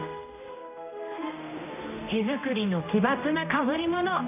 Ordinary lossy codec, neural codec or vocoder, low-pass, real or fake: none; codec, 16 kHz, 1 kbps, X-Codec, HuBERT features, trained on general audio; 3.6 kHz; fake